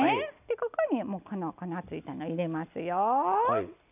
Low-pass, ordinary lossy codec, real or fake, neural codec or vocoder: 3.6 kHz; none; real; none